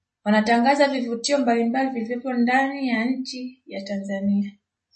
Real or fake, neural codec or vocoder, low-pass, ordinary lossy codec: real; none; 10.8 kHz; MP3, 32 kbps